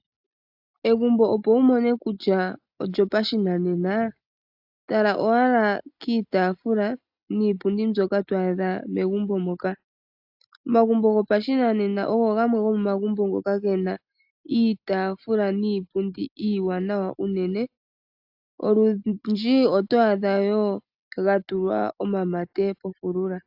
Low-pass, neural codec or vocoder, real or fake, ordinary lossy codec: 5.4 kHz; none; real; AAC, 48 kbps